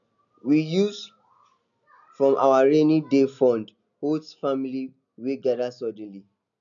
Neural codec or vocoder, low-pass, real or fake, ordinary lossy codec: none; 7.2 kHz; real; none